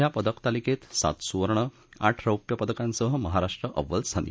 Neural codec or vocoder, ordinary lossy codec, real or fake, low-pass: none; none; real; none